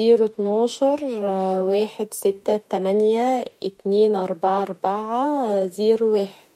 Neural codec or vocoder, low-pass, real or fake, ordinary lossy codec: autoencoder, 48 kHz, 32 numbers a frame, DAC-VAE, trained on Japanese speech; 19.8 kHz; fake; MP3, 64 kbps